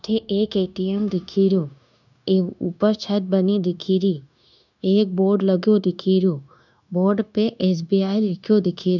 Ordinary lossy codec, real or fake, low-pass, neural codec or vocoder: none; fake; 7.2 kHz; codec, 16 kHz, 0.9 kbps, LongCat-Audio-Codec